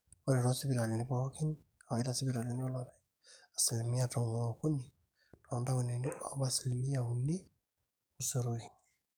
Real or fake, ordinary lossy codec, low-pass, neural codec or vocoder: fake; none; none; codec, 44.1 kHz, 7.8 kbps, DAC